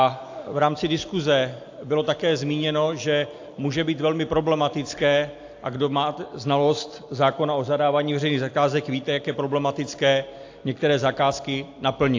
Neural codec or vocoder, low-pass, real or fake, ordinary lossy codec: none; 7.2 kHz; real; AAC, 48 kbps